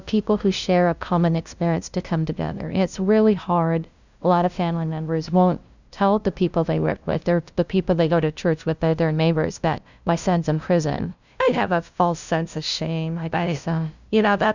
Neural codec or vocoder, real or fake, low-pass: codec, 16 kHz, 0.5 kbps, FunCodec, trained on LibriTTS, 25 frames a second; fake; 7.2 kHz